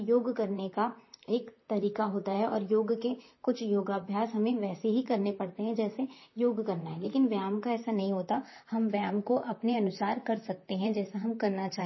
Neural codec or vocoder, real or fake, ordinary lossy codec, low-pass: vocoder, 44.1 kHz, 128 mel bands, Pupu-Vocoder; fake; MP3, 24 kbps; 7.2 kHz